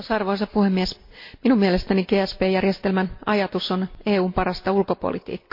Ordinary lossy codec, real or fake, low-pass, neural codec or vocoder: MP3, 32 kbps; real; 5.4 kHz; none